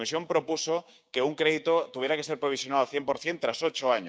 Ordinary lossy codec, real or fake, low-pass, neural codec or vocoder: none; fake; none; codec, 16 kHz, 6 kbps, DAC